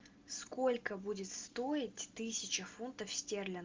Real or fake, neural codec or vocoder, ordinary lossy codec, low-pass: real; none; Opus, 24 kbps; 7.2 kHz